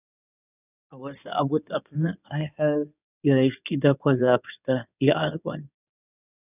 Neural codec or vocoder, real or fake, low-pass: codec, 16 kHz in and 24 kHz out, 2.2 kbps, FireRedTTS-2 codec; fake; 3.6 kHz